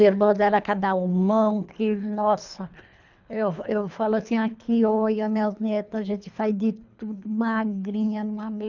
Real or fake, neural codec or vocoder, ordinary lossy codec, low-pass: fake; codec, 24 kHz, 3 kbps, HILCodec; none; 7.2 kHz